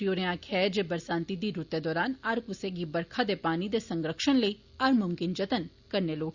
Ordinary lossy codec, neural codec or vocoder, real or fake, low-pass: Opus, 64 kbps; none; real; 7.2 kHz